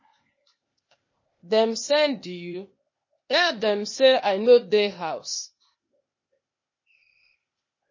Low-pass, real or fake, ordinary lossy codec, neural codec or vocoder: 7.2 kHz; fake; MP3, 32 kbps; codec, 16 kHz, 0.8 kbps, ZipCodec